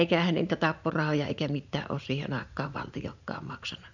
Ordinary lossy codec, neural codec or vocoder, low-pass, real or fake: none; vocoder, 44.1 kHz, 80 mel bands, Vocos; 7.2 kHz; fake